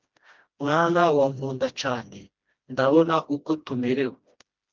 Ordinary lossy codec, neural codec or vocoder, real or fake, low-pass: Opus, 24 kbps; codec, 16 kHz, 1 kbps, FreqCodec, smaller model; fake; 7.2 kHz